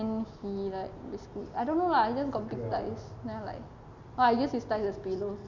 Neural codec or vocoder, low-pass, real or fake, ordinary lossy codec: none; 7.2 kHz; real; Opus, 64 kbps